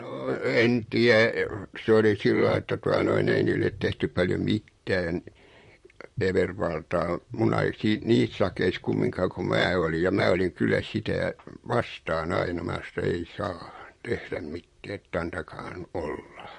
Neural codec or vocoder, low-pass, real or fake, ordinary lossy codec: vocoder, 44.1 kHz, 128 mel bands, Pupu-Vocoder; 14.4 kHz; fake; MP3, 48 kbps